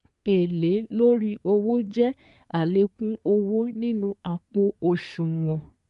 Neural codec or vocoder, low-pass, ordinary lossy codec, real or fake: codec, 24 kHz, 1 kbps, SNAC; 10.8 kHz; MP3, 64 kbps; fake